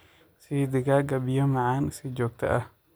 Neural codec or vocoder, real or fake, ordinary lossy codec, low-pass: none; real; none; none